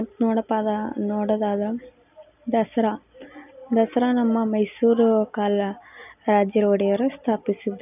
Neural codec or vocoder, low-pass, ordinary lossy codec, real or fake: none; 3.6 kHz; none; real